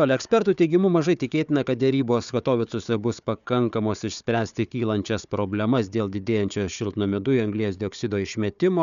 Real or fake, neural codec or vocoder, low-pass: fake; codec, 16 kHz, 4 kbps, FunCodec, trained on Chinese and English, 50 frames a second; 7.2 kHz